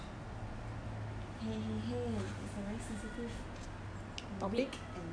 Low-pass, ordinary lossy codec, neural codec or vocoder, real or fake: 9.9 kHz; none; none; real